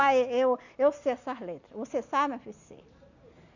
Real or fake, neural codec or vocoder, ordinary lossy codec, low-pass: real; none; none; 7.2 kHz